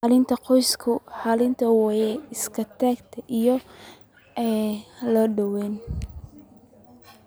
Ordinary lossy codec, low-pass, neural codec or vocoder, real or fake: none; none; none; real